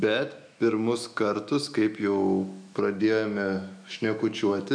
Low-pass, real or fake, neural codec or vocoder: 9.9 kHz; fake; autoencoder, 48 kHz, 128 numbers a frame, DAC-VAE, trained on Japanese speech